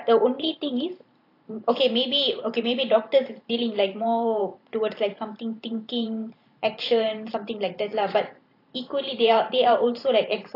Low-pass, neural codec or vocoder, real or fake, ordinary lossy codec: 5.4 kHz; vocoder, 44.1 kHz, 128 mel bands every 512 samples, BigVGAN v2; fake; AAC, 32 kbps